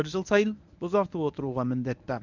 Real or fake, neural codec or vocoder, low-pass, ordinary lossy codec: fake; codec, 24 kHz, 0.9 kbps, WavTokenizer, medium speech release version 1; 7.2 kHz; none